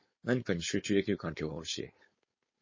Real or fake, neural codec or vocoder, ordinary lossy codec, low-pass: fake; codec, 16 kHz, 4.8 kbps, FACodec; MP3, 32 kbps; 7.2 kHz